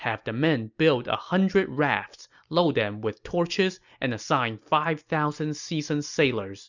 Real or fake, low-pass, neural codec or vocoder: real; 7.2 kHz; none